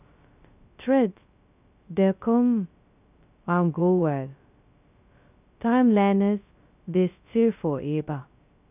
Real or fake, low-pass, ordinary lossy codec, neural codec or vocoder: fake; 3.6 kHz; none; codec, 16 kHz, 0.2 kbps, FocalCodec